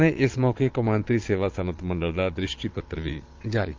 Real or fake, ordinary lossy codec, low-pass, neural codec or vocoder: fake; Opus, 32 kbps; 7.2 kHz; vocoder, 22.05 kHz, 80 mel bands, Vocos